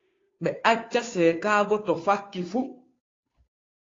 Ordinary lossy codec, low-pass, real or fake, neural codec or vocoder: AAC, 32 kbps; 7.2 kHz; fake; codec, 16 kHz, 2 kbps, FunCodec, trained on Chinese and English, 25 frames a second